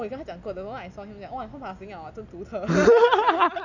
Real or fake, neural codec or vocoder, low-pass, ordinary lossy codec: real; none; 7.2 kHz; none